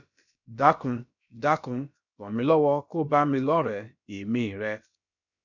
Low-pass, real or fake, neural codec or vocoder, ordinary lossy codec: 7.2 kHz; fake; codec, 16 kHz, about 1 kbps, DyCAST, with the encoder's durations; none